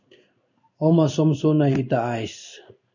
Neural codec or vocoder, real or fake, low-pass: codec, 16 kHz in and 24 kHz out, 1 kbps, XY-Tokenizer; fake; 7.2 kHz